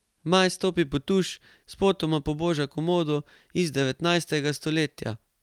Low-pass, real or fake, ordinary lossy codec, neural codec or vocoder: 19.8 kHz; real; Opus, 32 kbps; none